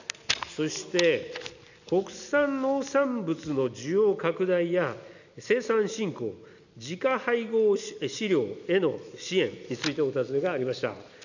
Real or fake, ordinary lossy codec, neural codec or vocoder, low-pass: real; none; none; 7.2 kHz